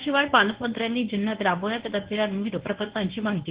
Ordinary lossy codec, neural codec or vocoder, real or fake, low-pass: Opus, 16 kbps; codec, 24 kHz, 0.9 kbps, WavTokenizer, medium speech release version 1; fake; 3.6 kHz